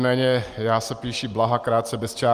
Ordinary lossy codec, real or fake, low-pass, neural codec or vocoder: Opus, 24 kbps; real; 14.4 kHz; none